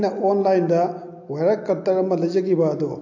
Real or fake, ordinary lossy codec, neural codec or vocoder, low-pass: real; AAC, 48 kbps; none; 7.2 kHz